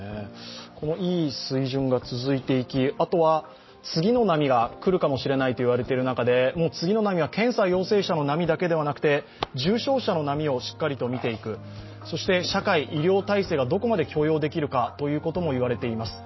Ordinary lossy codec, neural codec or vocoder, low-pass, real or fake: MP3, 24 kbps; none; 7.2 kHz; real